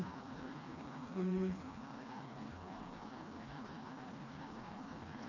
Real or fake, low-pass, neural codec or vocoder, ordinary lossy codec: fake; 7.2 kHz; codec, 16 kHz, 2 kbps, FreqCodec, smaller model; none